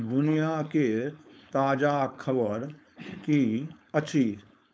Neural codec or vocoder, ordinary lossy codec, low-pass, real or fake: codec, 16 kHz, 4.8 kbps, FACodec; none; none; fake